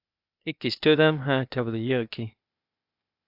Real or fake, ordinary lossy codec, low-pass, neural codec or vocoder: fake; AAC, 48 kbps; 5.4 kHz; codec, 16 kHz, 0.8 kbps, ZipCodec